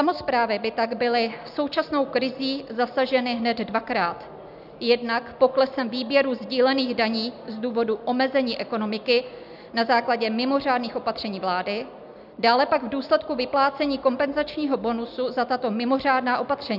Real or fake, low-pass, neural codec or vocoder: real; 5.4 kHz; none